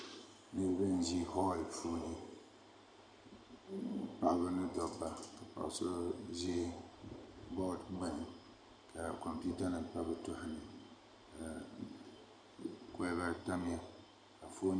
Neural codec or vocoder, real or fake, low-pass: none; real; 9.9 kHz